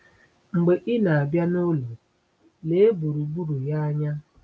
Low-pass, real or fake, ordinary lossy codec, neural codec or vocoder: none; real; none; none